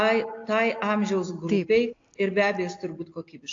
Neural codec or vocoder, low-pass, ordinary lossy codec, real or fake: none; 7.2 kHz; AAC, 48 kbps; real